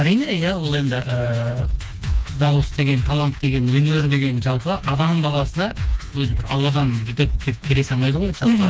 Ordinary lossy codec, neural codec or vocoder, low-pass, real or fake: none; codec, 16 kHz, 2 kbps, FreqCodec, smaller model; none; fake